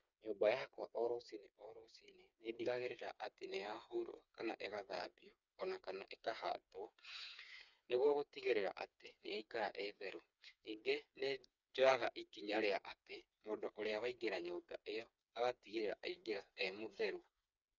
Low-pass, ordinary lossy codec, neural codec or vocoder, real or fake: 7.2 kHz; none; codec, 16 kHz, 4 kbps, FreqCodec, smaller model; fake